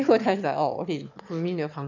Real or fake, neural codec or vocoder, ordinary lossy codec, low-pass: fake; autoencoder, 22.05 kHz, a latent of 192 numbers a frame, VITS, trained on one speaker; none; 7.2 kHz